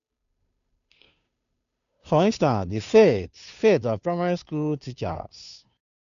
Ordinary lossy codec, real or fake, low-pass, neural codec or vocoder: none; fake; 7.2 kHz; codec, 16 kHz, 2 kbps, FunCodec, trained on Chinese and English, 25 frames a second